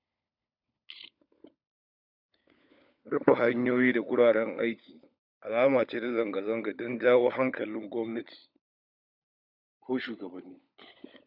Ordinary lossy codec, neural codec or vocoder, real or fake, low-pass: none; codec, 16 kHz, 16 kbps, FunCodec, trained on LibriTTS, 50 frames a second; fake; 5.4 kHz